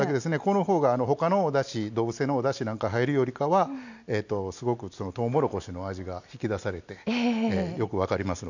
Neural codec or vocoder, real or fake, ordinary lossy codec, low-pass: none; real; none; 7.2 kHz